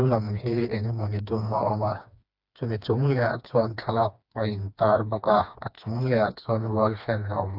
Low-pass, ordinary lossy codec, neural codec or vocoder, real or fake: 5.4 kHz; AAC, 48 kbps; codec, 16 kHz, 2 kbps, FreqCodec, smaller model; fake